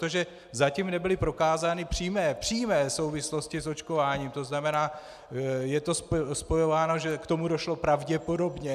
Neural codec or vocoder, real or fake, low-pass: none; real; 14.4 kHz